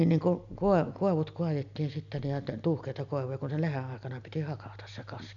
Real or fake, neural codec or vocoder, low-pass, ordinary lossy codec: real; none; 7.2 kHz; none